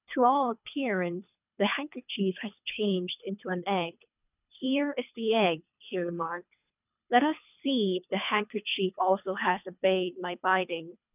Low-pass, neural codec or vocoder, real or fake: 3.6 kHz; codec, 24 kHz, 3 kbps, HILCodec; fake